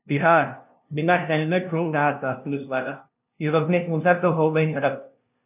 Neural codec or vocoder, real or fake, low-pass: codec, 16 kHz, 0.5 kbps, FunCodec, trained on LibriTTS, 25 frames a second; fake; 3.6 kHz